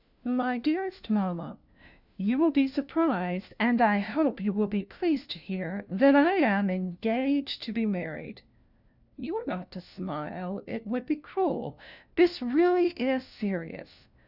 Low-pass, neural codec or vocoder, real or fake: 5.4 kHz; codec, 16 kHz, 1 kbps, FunCodec, trained on LibriTTS, 50 frames a second; fake